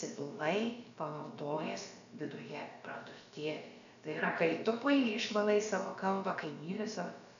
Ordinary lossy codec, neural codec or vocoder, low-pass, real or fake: MP3, 96 kbps; codec, 16 kHz, about 1 kbps, DyCAST, with the encoder's durations; 7.2 kHz; fake